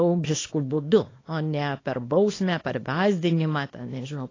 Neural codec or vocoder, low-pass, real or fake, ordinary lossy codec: codec, 24 kHz, 0.9 kbps, WavTokenizer, small release; 7.2 kHz; fake; AAC, 32 kbps